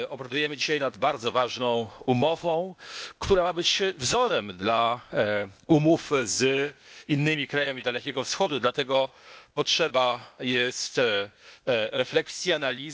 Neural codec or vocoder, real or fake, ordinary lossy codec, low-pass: codec, 16 kHz, 0.8 kbps, ZipCodec; fake; none; none